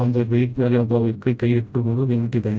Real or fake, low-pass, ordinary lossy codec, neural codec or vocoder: fake; none; none; codec, 16 kHz, 0.5 kbps, FreqCodec, smaller model